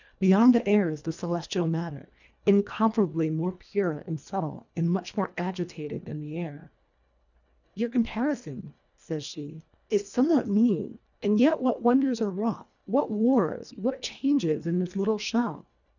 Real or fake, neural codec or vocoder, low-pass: fake; codec, 24 kHz, 1.5 kbps, HILCodec; 7.2 kHz